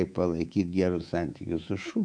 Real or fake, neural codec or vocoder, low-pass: fake; codec, 24 kHz, 3.1 kbps, DualCodec; 9.9 kHz